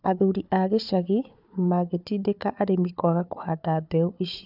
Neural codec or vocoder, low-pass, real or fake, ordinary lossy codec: codec, 16 kHz, 4 kbps, FunCodec, trained on Chinese and English, 50 frames a second; 5.4 kHz; fake; none